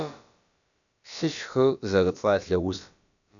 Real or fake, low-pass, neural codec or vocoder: fake; 7.2 kHz; codec, 16 kHz, about 1 kbps, DyCAST, with the encoder's durations